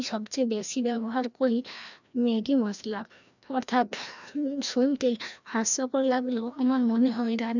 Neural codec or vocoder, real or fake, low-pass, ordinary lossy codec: codec, 16 kHz, 1 kbps, FreqCodec, larger model; fake; 7.2 kHz; none